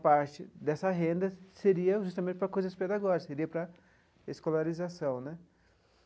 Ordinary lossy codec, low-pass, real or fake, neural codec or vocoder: none; none; real; none